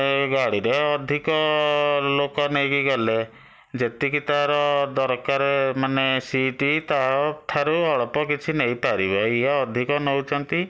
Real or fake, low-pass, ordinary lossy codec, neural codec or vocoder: real; none; none; none